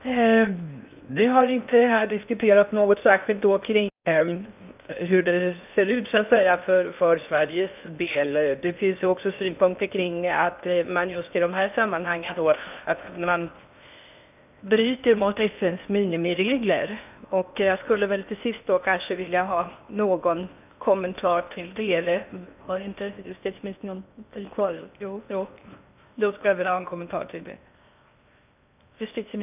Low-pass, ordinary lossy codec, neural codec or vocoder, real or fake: 3.6 kHz; none; codec, 16 kHz in and 24 kHz out, 0.6 kbps, FocalCodec, streaming, 4096 codes; fake